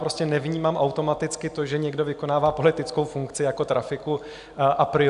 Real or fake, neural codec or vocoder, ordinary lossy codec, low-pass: real; none; AAC, 96 kbps; 10.8 kHz